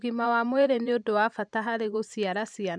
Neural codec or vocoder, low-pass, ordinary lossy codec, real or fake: vocoder, 44.1 kHz, 128 mel bands every 512 samples, BigVGAN v2; 9.9 kHz; none; fake